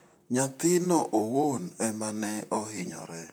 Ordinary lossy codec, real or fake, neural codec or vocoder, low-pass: none; fake; vocoder, 44.1 kHz, 128 mel bands, Pupu-Vocoder; none